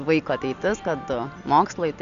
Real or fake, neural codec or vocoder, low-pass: real; none; 7.2 kHz